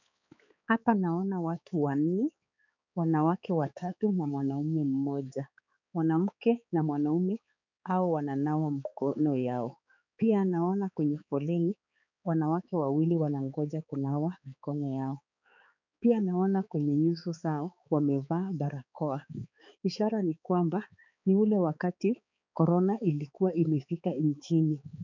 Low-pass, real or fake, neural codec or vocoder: 7.2 kHz; fake; codec, 16 kHz, 4 kbps, X-Codec, HuBERT features, trained on balanced general audio